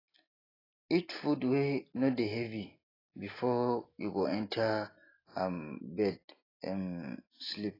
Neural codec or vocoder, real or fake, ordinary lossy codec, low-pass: none; real; AAC, 24 kbps; 5.4 kHz